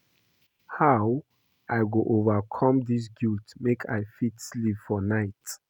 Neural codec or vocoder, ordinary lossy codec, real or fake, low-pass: none; none; real; 19.8 kHz